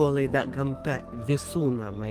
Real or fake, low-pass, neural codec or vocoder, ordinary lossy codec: fake; 14.4 kHz; codec, 44.1 kHz, 2.6 kbps, SNAC; Opus, 32 kbps